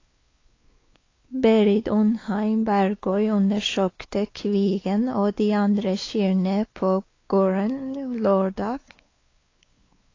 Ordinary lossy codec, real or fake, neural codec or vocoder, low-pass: AAC, 32 kbps; fake; codec, 16 kHz, 4 kbps, X-Codec, WavLM features, trained on Multilingual LibriSpeech; 7.2 kHz